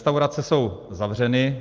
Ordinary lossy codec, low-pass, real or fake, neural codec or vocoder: Opus, 32 kbps; 7.2 kHz; real; none